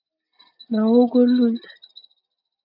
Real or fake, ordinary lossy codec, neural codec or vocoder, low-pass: real; AAC, 48 kbps; none; 5.4 kHz